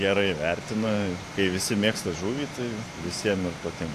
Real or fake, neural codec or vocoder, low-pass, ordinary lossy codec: fake; vocoder, 44.1 kHz, 128 mel bands every 512 samples, BigVGAN v2; 14.4 kHz; AAC, 64 kbps